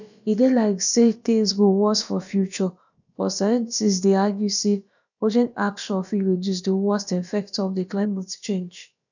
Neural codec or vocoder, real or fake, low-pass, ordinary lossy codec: codec, 16 kHz, about 1 kbps, DyCAST, with the encoder's durations; fake; 7.2 kHz; none